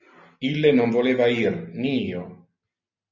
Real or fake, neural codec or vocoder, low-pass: real; none; 7.2 kHz